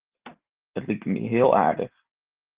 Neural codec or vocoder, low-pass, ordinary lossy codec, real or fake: none; 3.6 kHz; Opus, 16 kbps; real